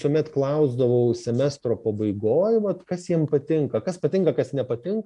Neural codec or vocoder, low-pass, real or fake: none; 10.8 kHz; real